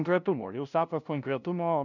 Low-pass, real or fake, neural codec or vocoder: 7.2 kHz; fake; codec, 16 kHz, 0.5 kbps, FunCodec, trained on LibriTTS, 25 frames a second